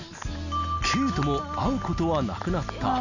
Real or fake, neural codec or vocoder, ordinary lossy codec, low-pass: real; none; none; 7.2 kHz